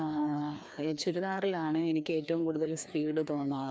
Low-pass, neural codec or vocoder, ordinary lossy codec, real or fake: none; codec, 16 kHz, 2 kbps, FreqCodec, larger model; none; fake